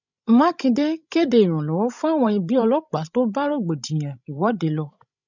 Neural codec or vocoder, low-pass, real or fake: codec, 16 kHz, 16 kbps, FreqCodec, larger model; 7.2 kHz; fake